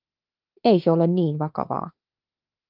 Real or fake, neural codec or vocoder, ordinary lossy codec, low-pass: fake; autoencoder, 48 kHz, 32 numbers a frame, DAC-VAE, trained on Japanese speech; Opus, 24 kbps; 5.4 kHz